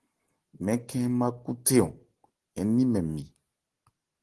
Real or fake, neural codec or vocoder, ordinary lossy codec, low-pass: real; none; Opus, 16 kbps; 10.8 kHz